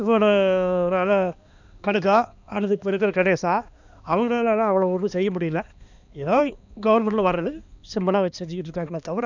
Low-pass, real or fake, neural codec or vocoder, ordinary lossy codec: 7.2 kHz; fake; codec, 16 kHz, 2 kbps, X-Codec, HuBERT features, trained on balanced general audio; none